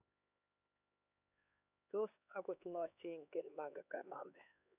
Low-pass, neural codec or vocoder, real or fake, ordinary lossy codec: 3.6 kHz; codec, 16 kHz, 4 kbps, X-Codec, HuBERT features, trained on LibriSpeech; fake; none